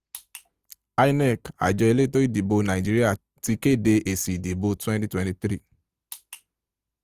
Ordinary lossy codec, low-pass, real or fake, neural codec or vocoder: Opus, 24 kbps; 14.4 kHz; real; none